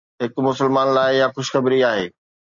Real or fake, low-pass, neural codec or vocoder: real; 7.2 kHz; none